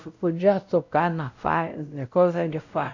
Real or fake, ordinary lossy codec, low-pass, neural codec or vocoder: fake; none; 7.2 kHz; codec, 16 kHz, 0.5 kbps, X-Codec, WavLM features, trained on Multilingual LibriSpeech